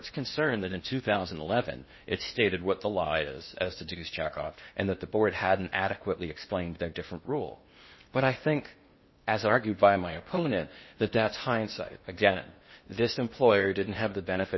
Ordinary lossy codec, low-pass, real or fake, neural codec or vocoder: MP3, 24 kbps; 7.2 kHz; fake; codec, 16 kHz in and 24 kHz out, 0.8 kbps, FocalCodec, streaming, 65536 codes